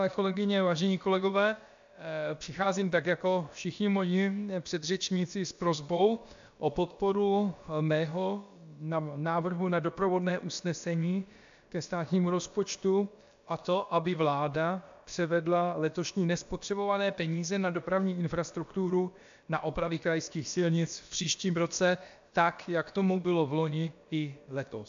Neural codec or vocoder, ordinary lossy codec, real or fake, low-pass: codec, 16 kHz, about 1 kbps, DyCAST, with the encoder's durations; AAC, 64 kbps; fake; 7.2 kHz